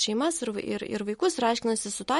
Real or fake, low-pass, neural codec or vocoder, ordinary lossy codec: real; 19.8 kHz; none; MP3, 48 kbps